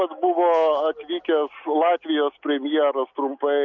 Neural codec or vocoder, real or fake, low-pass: none; real; 7.2 kHz